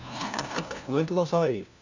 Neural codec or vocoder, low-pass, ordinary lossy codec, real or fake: codec, 16 kHz, 1 kbps, FunCodec, trained on LibriTTS, 50 frames a second; 7.2 kHz; none; fake